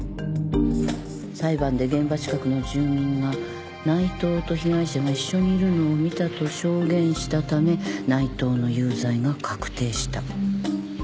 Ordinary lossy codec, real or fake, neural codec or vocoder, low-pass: none; real; none; none